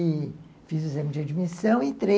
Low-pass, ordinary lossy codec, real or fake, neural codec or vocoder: none; none; real; none